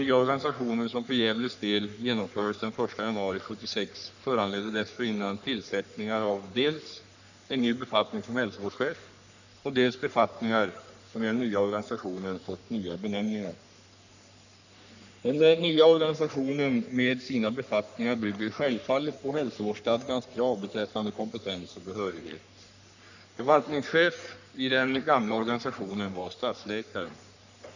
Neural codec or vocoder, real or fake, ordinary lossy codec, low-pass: codec, 44.1 kHz, 3.4 kbps, Pupu-Codec; fake; none; 7.2 kHz